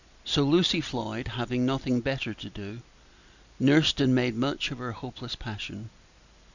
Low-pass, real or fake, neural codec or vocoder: 7.2 kHz; real; none